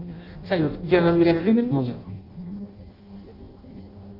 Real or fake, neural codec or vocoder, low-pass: fake; codec, 16 kHz in and 24 kHz out, 0.6 kbps, FireRedTTS-2 codec; 5.4 kHz